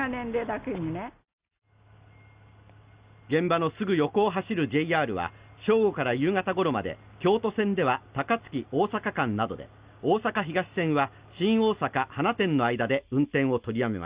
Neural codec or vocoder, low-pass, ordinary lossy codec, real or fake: none; 3.6 kHz; Opus, 64 kbps; real